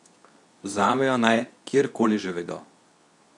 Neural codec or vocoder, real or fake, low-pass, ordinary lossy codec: codec, 24 kHz, 0.9 kbps, WavTokenizer, medium speech release version 2; fake; 10.8 kHz; none